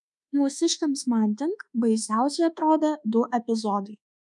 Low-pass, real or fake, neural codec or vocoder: 10.8 kHz; fake; codec, 24 kHz, 1.2 kbps, DualCodec